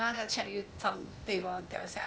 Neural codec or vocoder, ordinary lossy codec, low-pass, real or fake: codec, 16 kHz, 0.8 kbps, ZipCodec; none; none; fake